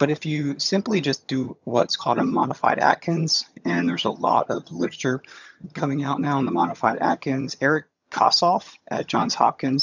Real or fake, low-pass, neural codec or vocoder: fake; 7.2 kHz; vocoder, 22.05 kHz, 80 mel bands, HiFi-GAN